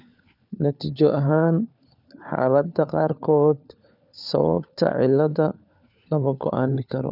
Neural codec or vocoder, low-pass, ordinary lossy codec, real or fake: codec, 16 kHz, 4 kbps, FunCodec, trained on LibriTTS, 50 frames a second; 5.4 kHz; none; fake